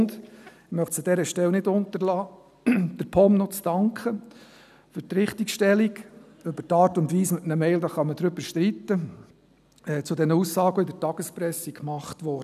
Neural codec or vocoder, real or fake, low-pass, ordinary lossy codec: none; real; 14.4 kHz; none